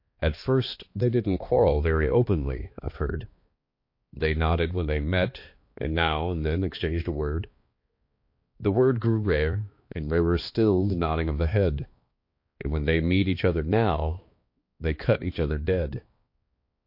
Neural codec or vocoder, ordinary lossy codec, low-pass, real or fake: codec, 16 kHz, 2 kbps, X-Codec, HuBERT features, trained on balanced general audio; MP3, 32 kbps; 5.4 kHz; fake